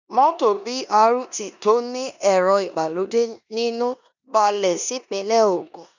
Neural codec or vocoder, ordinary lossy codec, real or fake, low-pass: codec, 16 kHz in and 24 kHz out, 0.9 kbps, LongCat-Audio-Codec, four codebook decoder; none; fake; 7.2 kHz